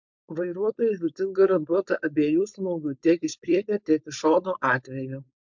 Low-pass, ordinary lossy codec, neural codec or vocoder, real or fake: 7.2 kHz; MP3, 64 kbps; codec, 16 kHz, 4.8 kbps, FACodec; fake